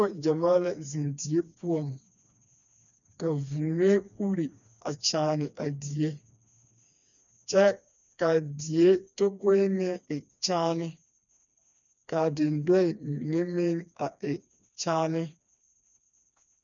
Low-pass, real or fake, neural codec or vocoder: 7.2 kHz; fake; codec, 16 kHz, 2 kbps, FreqCodec, smaller model